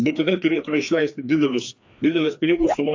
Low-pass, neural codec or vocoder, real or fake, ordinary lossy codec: 7.2 kHz; codec, 16 kHz, 2 kbps, FreqCodec, larger model; fake; AAC, 48 kbps